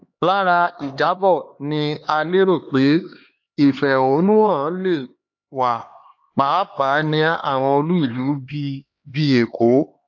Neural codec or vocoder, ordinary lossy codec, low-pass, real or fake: codec, 16 kHz, 2 kbps, X-Codec, HuBERT features, trained on LibriSpeech; AAC, 48 kbps; 7.2 kHz; fake